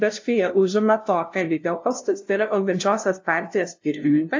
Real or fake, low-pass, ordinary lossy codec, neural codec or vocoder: fake; 7.2 kHz; AAC, 48 kbps; codec, 16 kHz, 0.5 kbps, FunCodec, trained on LibriTTS, 25 frames a second